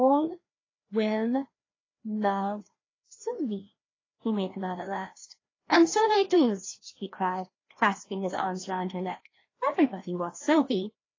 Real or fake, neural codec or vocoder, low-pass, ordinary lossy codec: fake; codec, 16 kHz, 1 kbps, FreqCodec, larger model; 7.2 kHz; AAC, 32 kbps